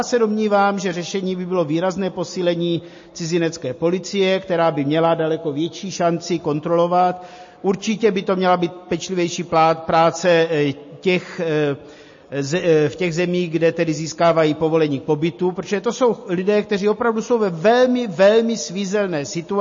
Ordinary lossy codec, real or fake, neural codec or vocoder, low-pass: MP3, 32 kbps; real; none; 7.2 kHz